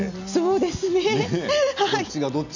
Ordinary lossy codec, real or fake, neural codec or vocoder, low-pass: none; real; none; 7.2 kHz